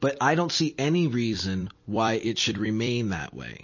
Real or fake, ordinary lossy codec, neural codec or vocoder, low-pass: fake; MP3, 32 kbps; vocoder, 44.1 kHz, 128 mel bands every 256 samples, BigVGAN v2; 7.2 kHz